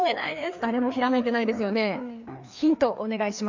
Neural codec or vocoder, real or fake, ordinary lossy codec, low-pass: codec, 16 kHz, 2 kbps, FreqCodec, larger model; fake; MP3, 64 kbps; 7.2 kHz